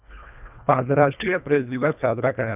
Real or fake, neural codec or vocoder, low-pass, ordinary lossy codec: fake; codec, 24 kHz, 1.5 kbps, HILCodec; 3.6 kHz; none